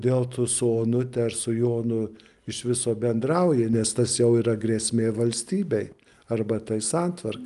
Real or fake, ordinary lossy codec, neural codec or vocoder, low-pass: real; Opus, 32 kbps; none; 10.8 kHz